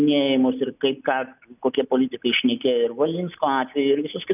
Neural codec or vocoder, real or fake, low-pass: none; real; 3.6 kHz